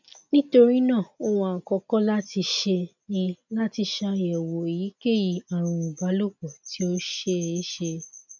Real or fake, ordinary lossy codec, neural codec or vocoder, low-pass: real; none; none; 7.2 kHz